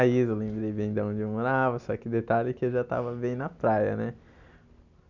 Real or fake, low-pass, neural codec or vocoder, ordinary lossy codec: real; 7.2 kHz; none; none